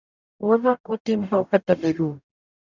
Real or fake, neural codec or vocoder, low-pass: fake; codec, 44.1 kHz, 0.9 kbps, DAC; 7.2 kHz